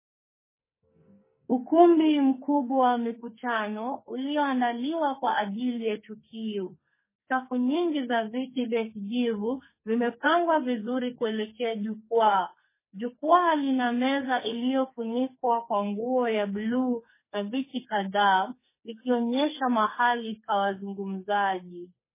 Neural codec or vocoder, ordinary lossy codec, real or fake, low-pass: codec, 44.1 kHz, 2.6 kbps, SNAC; MP3, 16 kbps; fake; 3.6 kHz